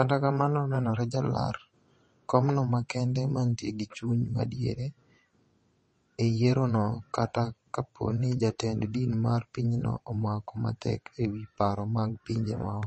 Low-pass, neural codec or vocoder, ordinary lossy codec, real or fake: 9.9 kHz; vocoder, 22.05 kHz, 80 mel bands, WaveNeXt; MP3, 32 kbps; fake